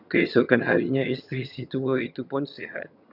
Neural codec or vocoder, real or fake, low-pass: vocoder, 22.05 kHz, 80 mel bands, HiFi-GAN; fake; 5.4 kHz